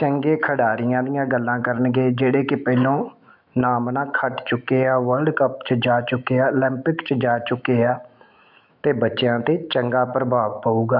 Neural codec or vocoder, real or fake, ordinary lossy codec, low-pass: autoencoder, 48 kHz, 128 numbers a frame, DAC-VAE, trained on Japanese speech; fake; none; 5.4 kHz